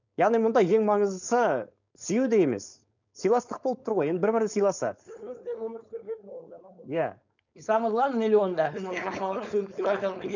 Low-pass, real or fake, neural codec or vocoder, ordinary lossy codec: 7.2 kHz; fake; codec, 16 kHz, 4.8 kbps, FACodec; none